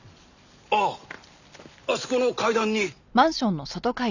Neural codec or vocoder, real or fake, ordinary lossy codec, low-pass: none; real; none; 7.2 kHz